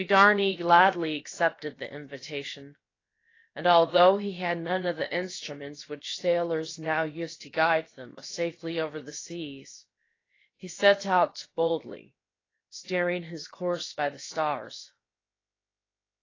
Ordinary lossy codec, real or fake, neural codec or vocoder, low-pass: AAC, 32 kbps; fake; codec, 16 kHz, about 1 kbps, DyCAST, with the encoder's durations; 7.2 kHz